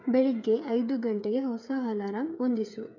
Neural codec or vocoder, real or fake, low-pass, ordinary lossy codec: codec, 16 kHz, 8 kbps, FreqCodec, smaller model; fake; 7.2 kHz; none